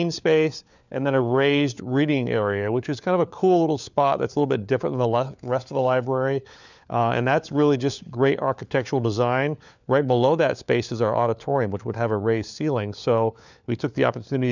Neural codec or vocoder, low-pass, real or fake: codec, 16 kHz, 4 kbps, FunCodec, trained on LibriTTS, 50 frames a second; 7.2 kHz; fake